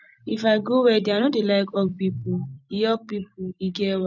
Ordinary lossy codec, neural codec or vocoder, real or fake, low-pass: none; vocoder, 44.1 kHz, 128 mel bands every 256 samples, BigVGAN v2; fake; 7.2 kHz